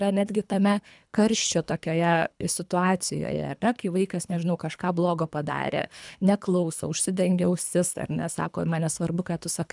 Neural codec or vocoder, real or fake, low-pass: codec, 24 kHz, 3 kbps, HILCodec; fake; 10.8 kHz